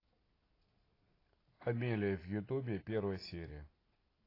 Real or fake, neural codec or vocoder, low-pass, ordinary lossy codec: real; none; 5.4 kHz; AAC, 24 kbps